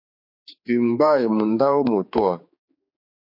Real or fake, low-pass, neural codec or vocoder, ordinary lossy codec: fake; 5.4 kHz; codec, 16 kHz, 4 kbps, X-Codec, HuBERT features, trained on general audio; MP3, 32 kbps